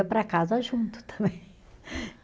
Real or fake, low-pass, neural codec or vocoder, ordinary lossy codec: real; none; none; none